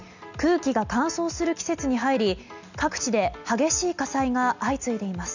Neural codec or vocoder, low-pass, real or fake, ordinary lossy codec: none; 7.2 kHz; real; none